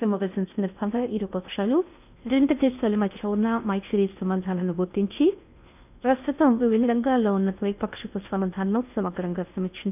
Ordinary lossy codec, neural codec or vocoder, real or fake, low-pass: none; codec, 16 kHz in and 24 kHz out, 0.6 kbps, FocalCodec, streaming, 4096 codes; fake; 3.6 kHz